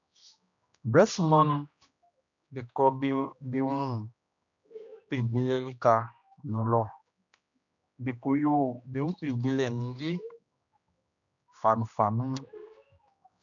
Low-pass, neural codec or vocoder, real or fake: 7.2 kHz; codec, 16 kHz, 1 kbps, X-Codec, HuBERT features, trained on general audio; fake